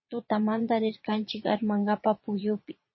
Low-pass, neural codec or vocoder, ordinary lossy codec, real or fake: 7.2 kHz; vocoder, 22.05 kHz, 80 mel bands, Vocos; MP3, 24 kbps; fake